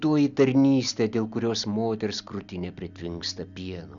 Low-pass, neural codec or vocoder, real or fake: 7.2 kHz; none; real